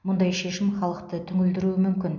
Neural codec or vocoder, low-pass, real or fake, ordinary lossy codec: none; 7.2 kHz; real; none